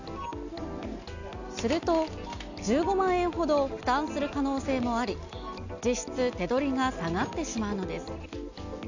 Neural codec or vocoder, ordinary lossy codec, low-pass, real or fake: none; none; 7.2 kHz; real